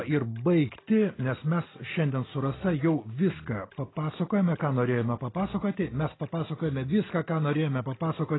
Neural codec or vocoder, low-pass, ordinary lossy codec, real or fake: none; 7.2 kHz; AAC, 16 kbps; real